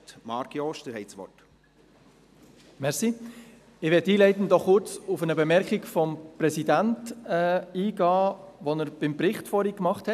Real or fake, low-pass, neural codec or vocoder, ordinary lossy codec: real; 14.4 kHz; none; none